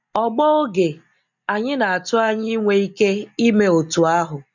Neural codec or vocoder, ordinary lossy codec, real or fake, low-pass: none; none; real; 7.2 kHz